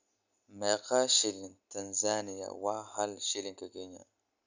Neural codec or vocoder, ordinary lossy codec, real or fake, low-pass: none; none; real; 7.2 kHz